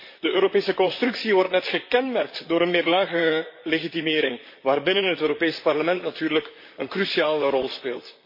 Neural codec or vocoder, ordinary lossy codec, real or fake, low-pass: vocoder, 44.1 kHz, 128 mel bands, Pupu-Vocoder; MP3, 32 kbps; fake; 5.4 kHz